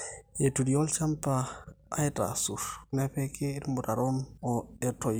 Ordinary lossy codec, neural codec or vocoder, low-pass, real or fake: none; none; none; real